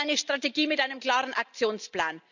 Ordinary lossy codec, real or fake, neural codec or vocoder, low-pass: none; real; none; 7.2 kHz